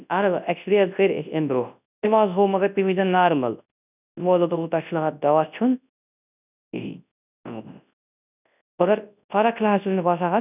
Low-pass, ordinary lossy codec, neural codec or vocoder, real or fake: 3.6 kHz; none; codec, 24 kHz, 0.9 kbps, WavTokenizer, large speech release; fake